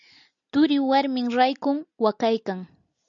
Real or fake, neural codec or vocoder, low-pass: real; none; 7.2 kHz